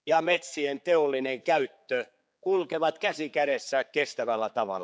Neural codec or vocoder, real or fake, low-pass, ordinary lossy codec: codec, 16 kHz, 4 kbps, X-Codec, HuBERT features, trained on general audio; fake; none; none